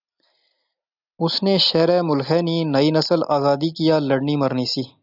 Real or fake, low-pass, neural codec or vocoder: real; 5.4 kHz; none